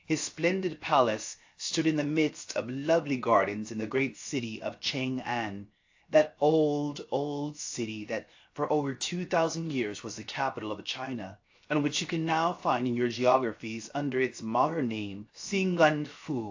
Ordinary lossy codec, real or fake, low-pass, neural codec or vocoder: AAC, 48 kbps; fake; 7.2 kHz; codec, 16 kHz, 0.7 kbps, FocalCodec